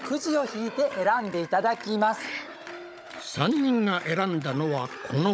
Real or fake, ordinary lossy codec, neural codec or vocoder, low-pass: fake; none; codec, 16 kHz, 16 kbps, FunCodec, trained on Chinese and English, 50 frames a second; none